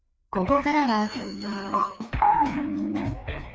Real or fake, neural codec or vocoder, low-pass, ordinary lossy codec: fake; codec, 16 kHz, 2 kbps, FreqCodec, larger model; none; none